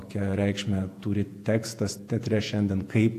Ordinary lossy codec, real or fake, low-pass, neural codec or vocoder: AAC, 64 kbps; fake; 14.4 kHz; vocoder, 48 kHz, 128 mel bands, Vocos